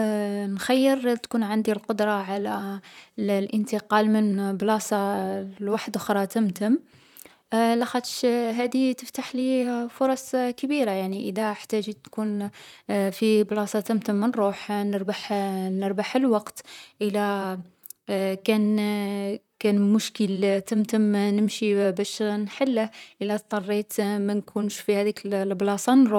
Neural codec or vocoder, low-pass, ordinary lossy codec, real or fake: vocoder, 44.1 kHz, 128 mel bands, Pupu-Vocoder; 19.8 kHz; none; fake